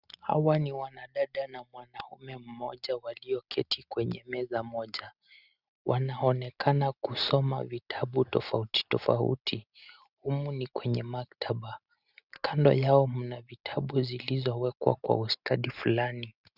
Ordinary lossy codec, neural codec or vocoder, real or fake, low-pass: Opus, 64 kbps; none; real; 5.4 kHz